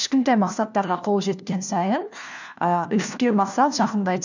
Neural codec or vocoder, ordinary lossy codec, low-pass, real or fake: codec, 16 kHz, 1 kbps, FunCodec, trained on LibriTTS, 50 frames a second; none; 7.2 kHz; fake